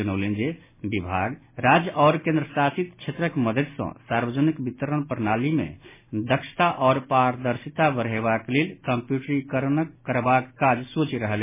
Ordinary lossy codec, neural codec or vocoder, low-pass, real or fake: MP3, 16 kbps; none; 3.6 kHz; real